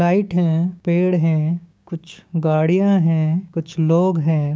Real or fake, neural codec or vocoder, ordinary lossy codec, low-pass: fake; codec, 16 kHz, 6 kbps, DAC; none; none